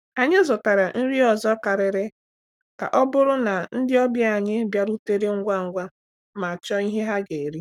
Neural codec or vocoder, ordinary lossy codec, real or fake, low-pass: codec, 44.1 kHz, 7.8 kbps, Pupu-Codec; none; fake; 19.8 kHz